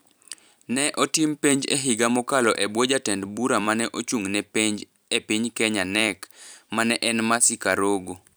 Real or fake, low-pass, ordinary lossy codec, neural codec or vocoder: fake; none; none; vocoder, 44.1 kHz, 128 mel bands every 256 samples, BigVGAN v2